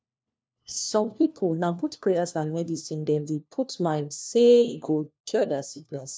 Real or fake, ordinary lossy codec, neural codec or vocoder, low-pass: fake; none; codec, 16 kHz, 1 kbps, FunCodec, trained on LibriTTS, 50 frames a second; none